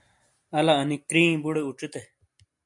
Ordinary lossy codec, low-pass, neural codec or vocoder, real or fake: MP3, 64 kbps; 10.8 kHz; none; real